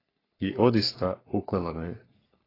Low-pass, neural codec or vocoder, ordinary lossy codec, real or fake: 5.4 kHz; codec, 44.1 kHz, 3.4 kbps, Pupu-Codec; AAC, 24 kbps; fake